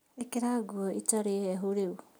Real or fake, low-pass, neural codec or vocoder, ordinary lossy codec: real; none; none; none